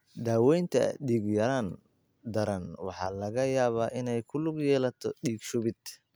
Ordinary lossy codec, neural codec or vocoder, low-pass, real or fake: none; none; none; real